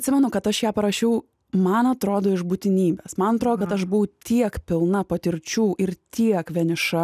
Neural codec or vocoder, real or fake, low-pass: none; real; 14.4 kHz